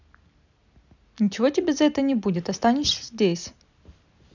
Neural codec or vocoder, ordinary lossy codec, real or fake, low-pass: none; none; real; 7.2 kHz